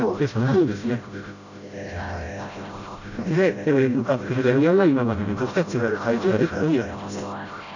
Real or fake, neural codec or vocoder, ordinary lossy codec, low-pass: fake; codec, 16 kHz, 0.5 kbps, FreqCodec, smaller model; none; 7.2 kHz